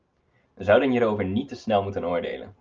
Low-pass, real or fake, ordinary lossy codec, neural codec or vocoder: 7.2 kHz; real; Opus, 24 kbps; none